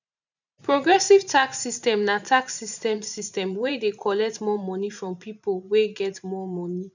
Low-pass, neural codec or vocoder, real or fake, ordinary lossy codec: 7.2 kHz; vocoder, 44.1 kHz, 128 mel bands every 512 samples, BigVGAN v2; fake; none